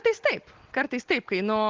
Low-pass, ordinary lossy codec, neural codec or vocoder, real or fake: 7.2 kHz; Opus, 16 kbps; none; real